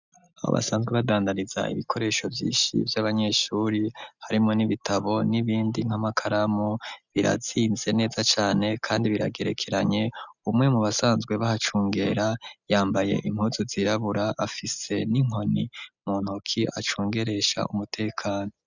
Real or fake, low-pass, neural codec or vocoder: real; 7.2 kHz; none